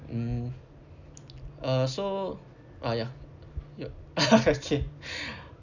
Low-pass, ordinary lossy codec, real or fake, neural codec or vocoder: 7.2 kHz; none; real; none